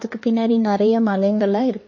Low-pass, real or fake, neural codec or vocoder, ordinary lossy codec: 7.2 kHz; fake; codec, 16 kHz, 2 kbps, X-Codec, HuBERT features, trained on LibriSpeech; MP3, 32 kbps